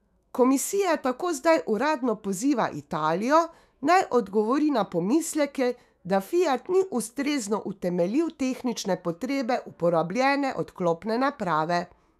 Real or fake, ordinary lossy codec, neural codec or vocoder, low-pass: fake; none; autoencoder, 48 kHz, 128 numbers a frame, DAC-VAE, trained on Japanese speech; 14.4 kHz